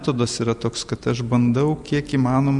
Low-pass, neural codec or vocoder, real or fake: 10.8 kHz; none; real